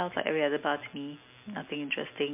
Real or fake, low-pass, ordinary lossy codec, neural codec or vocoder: real; 3.6 kHz; MP3, 32 kbps; none